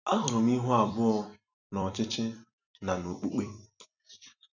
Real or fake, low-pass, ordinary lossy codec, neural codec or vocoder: fake; 7.2 kHz; none; vocoder, 44.1 kHz, 128 mel bands every 256 samples, BigVGAN v2